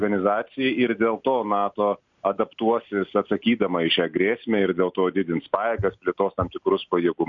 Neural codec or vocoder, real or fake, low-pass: none; real; 7.2 kHz